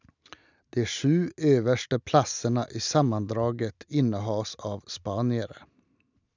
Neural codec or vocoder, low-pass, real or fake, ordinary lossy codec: none; 7.2 kHz; real; none